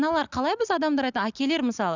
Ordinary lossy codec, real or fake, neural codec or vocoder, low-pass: none; real; none; 7.2 kHz